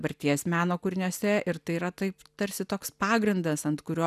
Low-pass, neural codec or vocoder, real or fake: 14.4 kHz; none; real